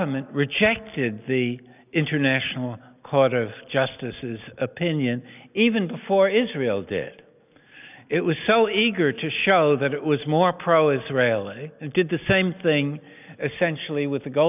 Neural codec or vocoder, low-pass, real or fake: none; 3.6 kHz; real